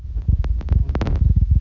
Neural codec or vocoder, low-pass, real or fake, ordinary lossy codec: none; 7.2 kHz; real; none